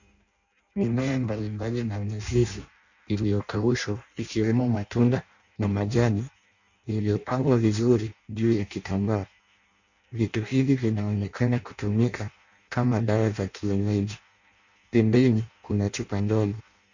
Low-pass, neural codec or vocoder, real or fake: 7.2 kHz; codec, 16 kHz in and 24 kHz out, 0.6 kbps, FireRedTTS-2 codec; fake